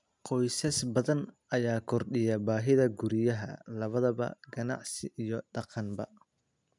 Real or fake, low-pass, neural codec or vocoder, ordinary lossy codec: real; 10.8 kHz; none; none